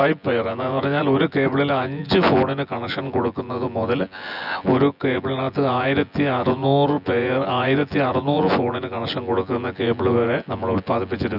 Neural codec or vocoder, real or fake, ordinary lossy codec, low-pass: vocoder, 24 kHz, 100 mel bands, Vocos; fake; none; 5.4 kHz